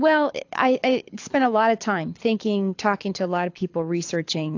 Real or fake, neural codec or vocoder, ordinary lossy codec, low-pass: fake; codec, 24 kHz, 6 kbps, HILCodec; AAC, 48 kbps; 7.2 kHz